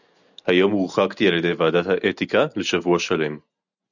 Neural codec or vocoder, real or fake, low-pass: none; real; 7.2 kHz